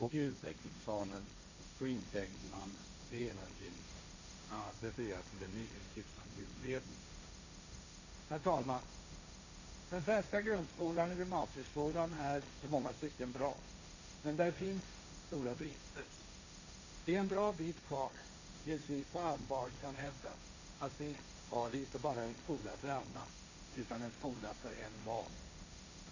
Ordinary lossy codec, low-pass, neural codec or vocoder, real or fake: none; 7.2 kHz; codec, 16 kHz, 1.1 kbps, Voila-Tokenizer; fake